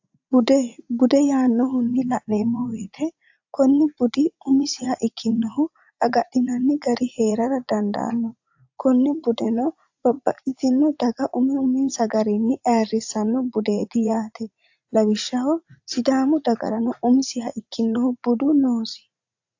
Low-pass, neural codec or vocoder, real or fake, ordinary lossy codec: 7.2 kHz; vocoder, 44.1 kHz, 80 mel bands, Vocos; fake; AAC, 48 kbps